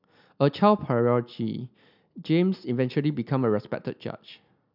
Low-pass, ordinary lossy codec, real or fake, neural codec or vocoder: 5.4 kHz; none; real; none